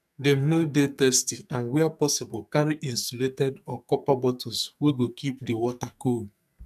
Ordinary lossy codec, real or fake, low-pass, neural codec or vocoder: none; fake; 14.4 kHz; codec, 44.1 kHz, 2.6 kbps, SNAC